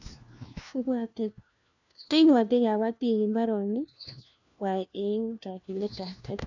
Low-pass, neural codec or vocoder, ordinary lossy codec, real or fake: 7.2 kHz; codec, 16 kHz, 1 kbps, FunCodec, trained on LibriTTS, 50 frames a second; none; fake